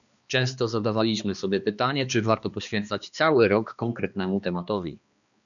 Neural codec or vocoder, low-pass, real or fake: codec, 16 kHz, 2 kbps, X-Codec, HuBERT features, trained on balanced general audio; 7.2 kHz; fake